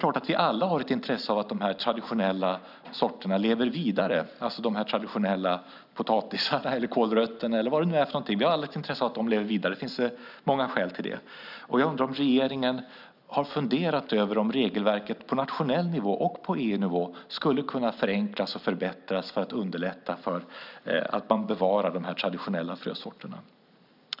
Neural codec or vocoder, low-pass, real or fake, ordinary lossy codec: none; 5.4 kHz; real; none